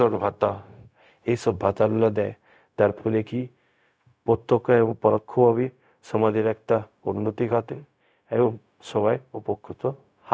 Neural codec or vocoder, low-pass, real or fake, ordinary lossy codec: codec, 16 kHz, 0.4 kbps, LongCat-Audio-Codec; none; fake; none